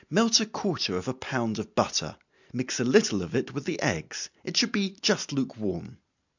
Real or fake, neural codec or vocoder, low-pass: real; none; 7.2 kHz